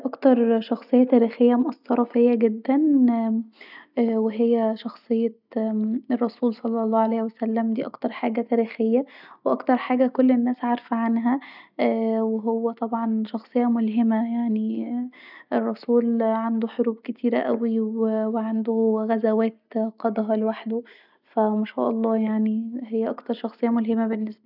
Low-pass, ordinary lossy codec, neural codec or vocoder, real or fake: 5.4 kHz; none; none; real